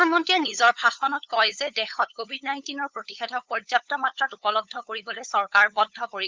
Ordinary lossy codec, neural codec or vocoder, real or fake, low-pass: Opus, 32 kbps; codec, 16 kHz, 8 kbps, FunCodec, trained on LibriTTS, 25 frames a second; fake; 7.2 kHz